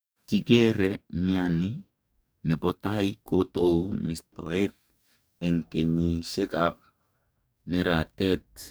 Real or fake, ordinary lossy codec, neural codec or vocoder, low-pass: fake; none; codec, 44.1 kHz, 2.6 kbps, DAC; none